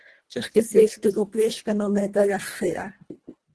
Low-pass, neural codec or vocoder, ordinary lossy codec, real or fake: 10.8 kHz; codec, 24 kHz, 1.5 kbps, HILCodec; Opus, 16 kbps; fake